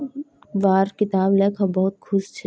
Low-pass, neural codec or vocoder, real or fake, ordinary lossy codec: none; none; real; none